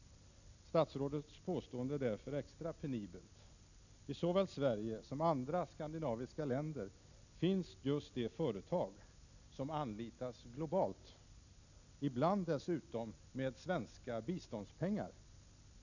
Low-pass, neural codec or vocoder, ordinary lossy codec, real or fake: 7.2 kHz; none; none; real